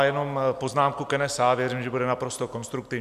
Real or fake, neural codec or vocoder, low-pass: real; none; 14.4 kHz